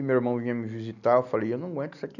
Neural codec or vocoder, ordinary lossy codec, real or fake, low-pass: none; none; real; 7.2 kHz